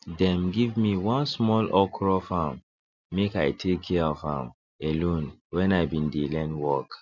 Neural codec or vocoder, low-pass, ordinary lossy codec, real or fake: none; 7.2 kHz; none; real